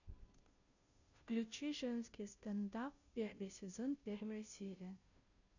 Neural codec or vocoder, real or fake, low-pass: codec, 16 kHz, 0.5 kbps, FunCodec, trained on Chinese and English, 25 frames a second; fake; 7.2 kHz